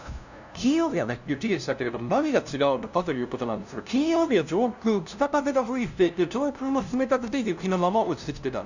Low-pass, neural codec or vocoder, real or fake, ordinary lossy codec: 7.2 kHz; codec, 16 kHz, 0.5 kbps, FunCodec, trained on LibriTTS, 25 frames a second; fake; none